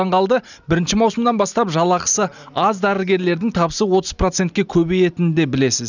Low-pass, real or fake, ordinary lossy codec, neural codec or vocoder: 7.2 kHz; real; none; none